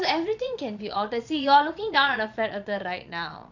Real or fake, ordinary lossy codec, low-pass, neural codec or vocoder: fake; none; 7.2 kHz; vocoder, 22.05 kHz, 80 mel bands, Vocos